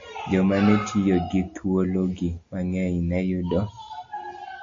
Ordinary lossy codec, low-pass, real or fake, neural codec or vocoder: AAC, 48 kbps; 7.2 kHz; real; none